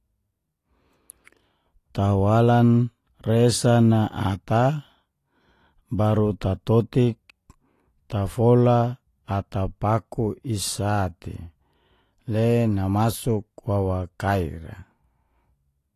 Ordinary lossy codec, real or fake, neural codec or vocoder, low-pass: AAC, 48 kbps; real; none; 14.4 kHz